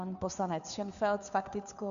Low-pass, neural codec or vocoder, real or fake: 7.2 kHz; codec, 16 kHz, 2 kbps, FunCodec, trained on Chinese and English, 25 frames a second; fake